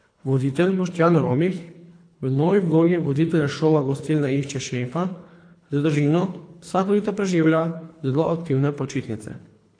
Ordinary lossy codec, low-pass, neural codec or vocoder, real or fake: AAC, 48 kbps; 9.9 kHz; codec, 24 kHz, 3 kbps, HILCodec; fake